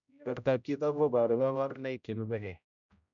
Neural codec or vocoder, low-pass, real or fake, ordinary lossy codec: codec, 16 kHz, 0.5 kbps, X-Codec, HuBERT features, trained on general audio; 7.2 kHz; fake; none